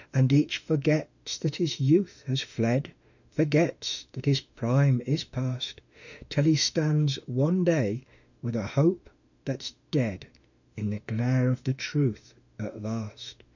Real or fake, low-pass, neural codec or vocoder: fake; 7.2 kHz; autoencoder, 48 kHz, 32 numbers a frame, DAC-VAE, trained on Japanese speech